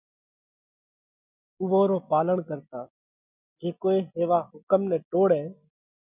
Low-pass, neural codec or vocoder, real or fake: 3.6 kHz; none; real